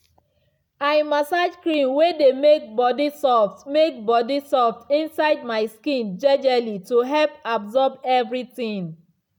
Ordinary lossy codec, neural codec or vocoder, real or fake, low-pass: none; none; real; 19.8 kHz